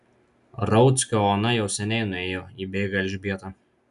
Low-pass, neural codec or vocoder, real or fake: 10.8 kHz; none; real